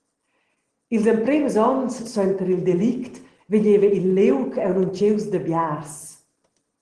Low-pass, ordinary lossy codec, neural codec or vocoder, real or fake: 9.9 kHz; Opus, 16 kbps; none; real